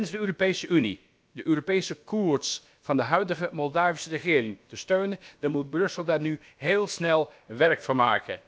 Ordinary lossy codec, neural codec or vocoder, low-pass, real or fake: none; codec, 16 kHz, about 1 kbps, DyCAST, with the encoder's durations; none; fake